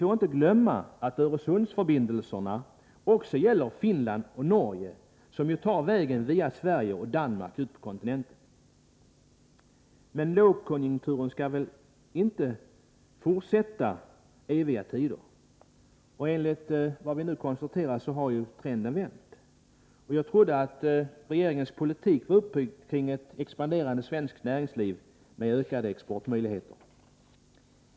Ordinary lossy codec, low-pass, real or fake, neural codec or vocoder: none; none; real; none